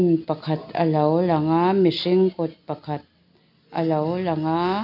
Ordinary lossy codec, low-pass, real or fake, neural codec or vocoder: none; 5.4 kHz; real; none